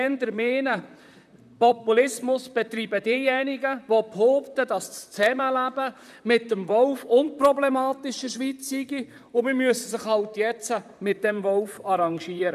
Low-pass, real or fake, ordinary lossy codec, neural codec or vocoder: 14.4 kHz; fake; none; vocoder, 44.1 kHz, 128 mel bands, Pupu-Vocoder